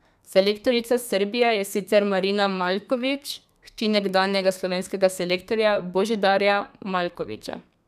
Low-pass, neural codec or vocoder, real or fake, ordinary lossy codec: 14.4 kHz; codec, 32 kHz, 1.9 kbps, SNAC; fake; none